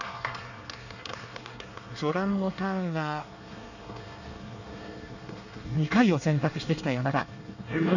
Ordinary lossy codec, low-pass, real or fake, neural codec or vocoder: none; 7.2 kHz; fake; codec, 24 kHz, 1 kbps, SNAC